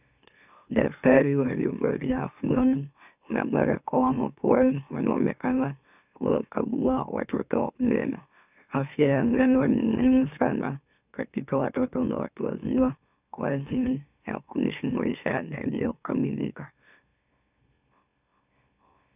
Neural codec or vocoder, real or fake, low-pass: autoencoder, 44.1 kHz, a latent of 192 numbers a frame, MeloTTS; fake; 3.6 kHz